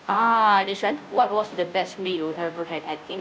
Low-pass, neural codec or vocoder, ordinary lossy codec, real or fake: none; codec, 16 kHz, 0.5 kbps, FunCodec, trained on Chinese and English, 25 frames a second; none; fake